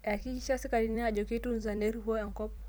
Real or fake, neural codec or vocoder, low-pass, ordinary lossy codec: fake; vocoder, 44.1 kHz, 128 mel bands every 256 samples, BigVGAN v2; none; none